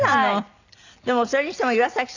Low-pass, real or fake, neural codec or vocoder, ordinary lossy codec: 7.2 kHz; real; none; none